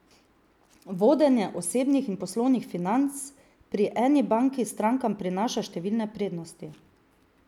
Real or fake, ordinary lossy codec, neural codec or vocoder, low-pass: real; none; none; 19.8 kHz